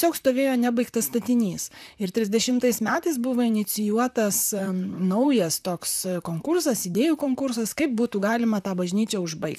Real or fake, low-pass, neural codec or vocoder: fake; 14.4 kHz; vocoder, 44.1 kHz, 128 mel bands, Pupu-Vocoder